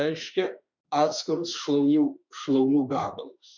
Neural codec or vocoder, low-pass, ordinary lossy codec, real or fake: autoencoder, 48 kHz, 32 numbers a frame, DAC-VAE, trained on Japanese speech; 7.2 kHz; MP3, 64 kbps; fake